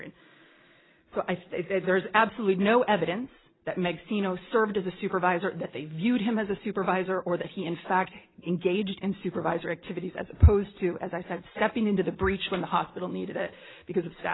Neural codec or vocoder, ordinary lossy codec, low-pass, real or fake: none; AAC, 16 kbps; 7.2 kHz; real